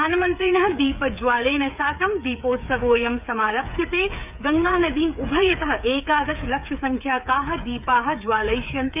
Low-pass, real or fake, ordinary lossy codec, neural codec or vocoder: 3.6 kHz; fake; none; codec, 16 kHz, 8 kbps, FreqCodec, smaller model